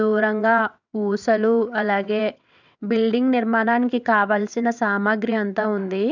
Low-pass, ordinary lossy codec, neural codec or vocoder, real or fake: 7.2 kHz; none; vocoder, 22.05 kHz, 80 mel bands, WaveNeXt; fake